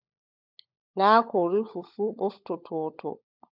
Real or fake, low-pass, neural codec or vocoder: fake; 5.4 kHz; codec, 16 kHz, 16 kbps, FunCodec, trained on LibriTTS, 50 frames a second